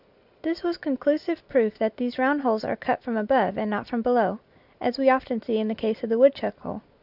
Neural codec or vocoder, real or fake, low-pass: none; real; 5.4 kHz